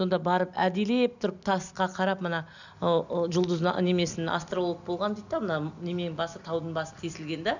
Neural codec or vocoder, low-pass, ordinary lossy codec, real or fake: none; 7.2 kHz; none; real